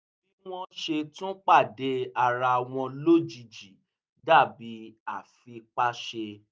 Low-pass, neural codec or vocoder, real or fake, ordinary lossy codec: none; none; real; none